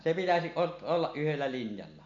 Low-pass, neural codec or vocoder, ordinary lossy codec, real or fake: 7.2 kHz; none; MP3, 48 kbps; real